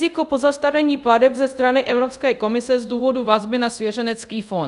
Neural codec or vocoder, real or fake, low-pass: codec, 24 kHz, 0.5 kbps, DualCodec; fake; 10.8 kHz